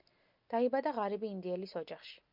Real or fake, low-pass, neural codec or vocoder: real; 5.4 kHz; none